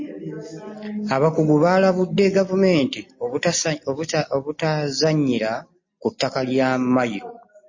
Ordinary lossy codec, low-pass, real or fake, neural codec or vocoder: MP3, 32 kbps; 7.2 kHz; real; none